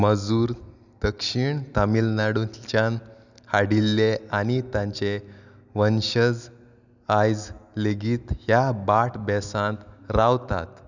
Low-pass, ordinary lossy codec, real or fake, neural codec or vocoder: 7.2 kHz; none; real; none